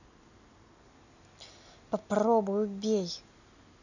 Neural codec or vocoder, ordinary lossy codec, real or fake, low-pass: none; none; real; 7.2 kHz